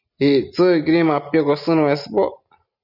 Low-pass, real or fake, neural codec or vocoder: 5.4 kHz; real; none